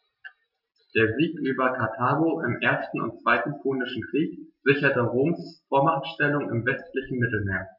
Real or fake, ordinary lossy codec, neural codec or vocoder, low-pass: real; none; none; 5.4 kHz